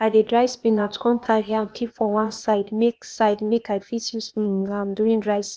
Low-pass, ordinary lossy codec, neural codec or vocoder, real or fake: none; none; codec, 16 kHz, 0.8 kbps, ZipCodec; fake